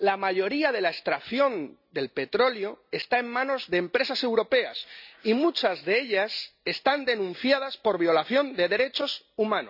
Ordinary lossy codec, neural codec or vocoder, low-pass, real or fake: none; none; 5.4 kHz; real